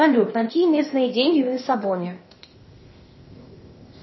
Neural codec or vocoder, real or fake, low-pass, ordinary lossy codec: codec, 16 kHz, 0.8 kbps, ZipCodec; fake; 7.2 kHz; MP3, 24 kbps